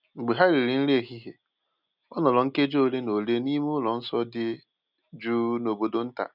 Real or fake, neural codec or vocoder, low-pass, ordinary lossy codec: real; none; 5.4 kHz; none